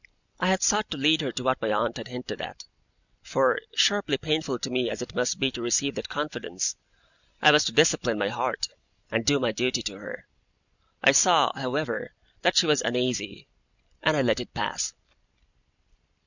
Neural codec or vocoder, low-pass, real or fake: none; 7.2 kHz; real